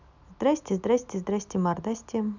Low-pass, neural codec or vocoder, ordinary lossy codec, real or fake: 7.2 kHz; none; none; real